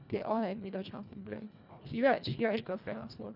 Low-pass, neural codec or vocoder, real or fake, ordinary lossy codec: 5.4 kHz; codec, 24 kHz, 1.5 kbps, HILCodec; fake; none